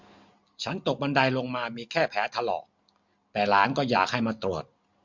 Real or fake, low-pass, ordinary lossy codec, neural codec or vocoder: real; 7.2 kHz; MP3, 64 kbps; none